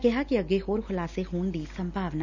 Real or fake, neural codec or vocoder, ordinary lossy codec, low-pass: real; none; none; 7.2 kHz